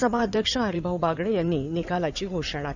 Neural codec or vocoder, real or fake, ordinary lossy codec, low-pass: codec, 16 kHz in and 24 kHz out, 2.2 kbps, FireRedTTS-2 codec; fake; none; 7.2 kHz